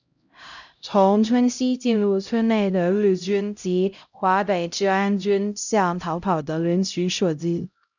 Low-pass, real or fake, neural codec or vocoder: 7.2 kHz; fake; codec, 16 kHz, 0.5 kbps, X-Codec, HuBERT features, trained on LibriSpeech